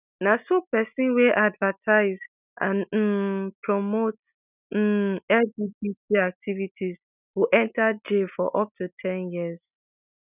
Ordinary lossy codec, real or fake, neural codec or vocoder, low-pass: none; real; none; 3.6 kHz